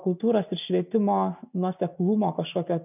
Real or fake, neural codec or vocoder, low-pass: real; none; 3.6 kHz